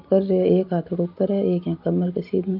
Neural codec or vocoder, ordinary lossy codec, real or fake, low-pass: none; Opus, 24 kbps; real; 5.4 kHz